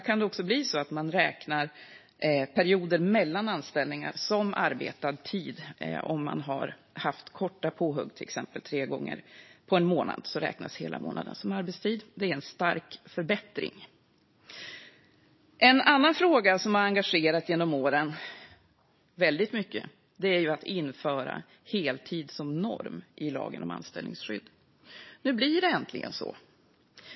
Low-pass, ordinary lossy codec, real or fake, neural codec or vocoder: 7.2 kHz; MP3, 24 kbps; real; none